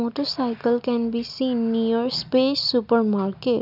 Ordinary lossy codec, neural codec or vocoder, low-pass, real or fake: none; none; 5.4 kHz; real